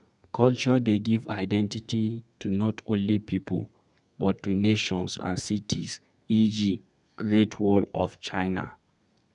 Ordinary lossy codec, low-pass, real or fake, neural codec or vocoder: none; 10.8 kHz; fake; codec, 32 kHz, 1.9 kbps, SNAC